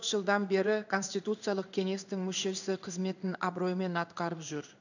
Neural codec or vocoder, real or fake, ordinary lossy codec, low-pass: codec, 16 kHz in and 24 kHz out, 1 kbps, XY-Tokenizer; fake; none; 7.2 kHz